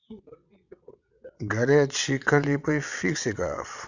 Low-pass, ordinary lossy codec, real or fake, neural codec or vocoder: 7.2 kHz; none; fake; vocoder, 22.05 kHz, 80 mel bands, WaveNeXt